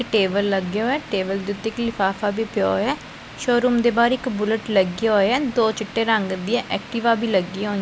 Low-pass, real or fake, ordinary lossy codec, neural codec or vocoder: none; real; none; none